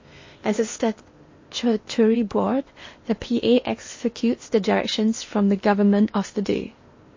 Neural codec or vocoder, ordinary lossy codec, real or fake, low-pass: codec, 16 kHz in and 24 kHz out, 0.8 kbps, FocalCodec, streaming, 65536 codes; MP3, 32 kbps; fake; 7.2 kHz